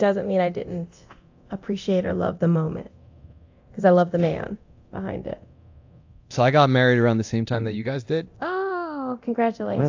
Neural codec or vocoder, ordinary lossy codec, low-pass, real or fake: codec, 24 kHz, 0.9 kbps, DualCodec; AAC, 48 kbps; 7.2 kHz; fake